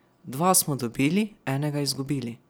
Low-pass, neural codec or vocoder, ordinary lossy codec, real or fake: none; none; none; real